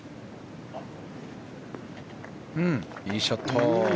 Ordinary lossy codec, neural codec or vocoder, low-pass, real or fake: none; none; none; real